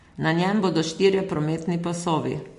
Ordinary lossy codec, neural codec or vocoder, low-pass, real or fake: MP3, 48 kbps; none; 14.4 kHz; real